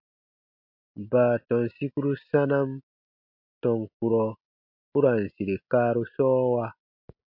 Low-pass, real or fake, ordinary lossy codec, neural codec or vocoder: 5.4 kHz; real; MP3, 48 kbps; none